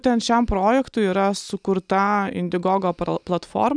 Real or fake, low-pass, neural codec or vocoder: real; 9.9 kHz; none